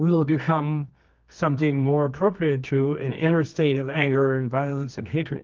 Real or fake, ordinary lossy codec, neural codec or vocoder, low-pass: fake; Opus, 32 kbps; codec, 24 kHz, 0.9 kbps, WavTokenizer, medium music audio release; 7.2 kHz